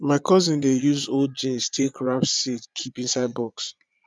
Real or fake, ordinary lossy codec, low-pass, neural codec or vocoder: fake; none; none; vocoder, 22.05 kHz, 80 mel bands, Vocos